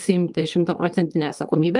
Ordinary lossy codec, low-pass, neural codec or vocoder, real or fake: Opus, 32 kbps; 10.8 kHz; codec, 24 kHz, 0.9 kbps, WavTokenizer, small release; fake